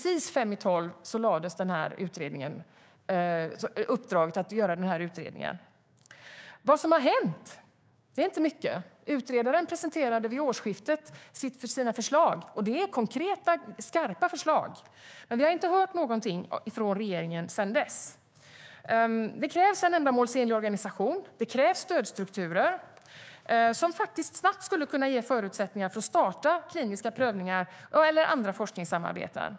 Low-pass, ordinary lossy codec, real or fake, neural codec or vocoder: none; none; fake; codec, 16 kHz, 6 kbps, DAC